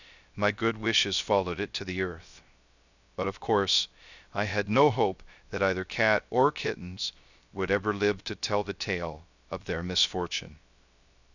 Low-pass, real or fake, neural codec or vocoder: 7.2 kHz; fake; codec, 16 kHz, 0.3 kbps, FocalCodec